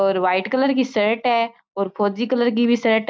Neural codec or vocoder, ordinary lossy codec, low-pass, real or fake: none; none; none; real